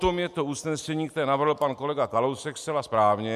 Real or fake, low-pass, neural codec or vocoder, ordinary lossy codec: real; 14.4 kHz; none; MP3, 96 kbps